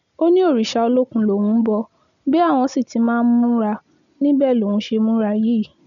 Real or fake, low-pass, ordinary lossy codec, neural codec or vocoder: real; 7.2 kHz; none; none